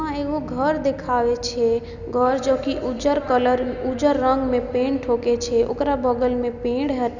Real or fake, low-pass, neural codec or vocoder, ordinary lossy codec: real; 7.2 kHz; none; none